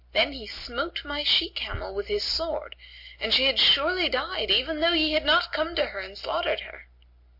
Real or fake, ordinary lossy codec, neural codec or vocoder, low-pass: real; MP3, 32 kbps; none; 5.4 kHz